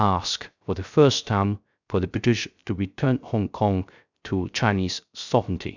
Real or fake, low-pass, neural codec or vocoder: fake; 7.2 kHz; codec, 16 kHz, 0.3 kbps, FocalCodec